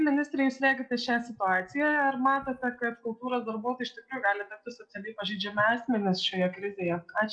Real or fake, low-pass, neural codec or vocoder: real; 9.9 kHz; none